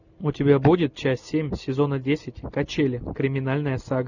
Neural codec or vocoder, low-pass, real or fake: none; 7.2 kHz; real